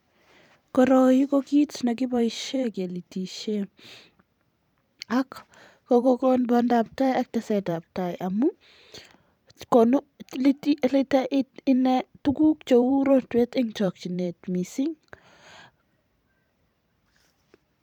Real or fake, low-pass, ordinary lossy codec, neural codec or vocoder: fake; 19.8 kHz; none; vocoder, 44.1 kHz, 128 mel bands every 512 samples, BigVGAN v2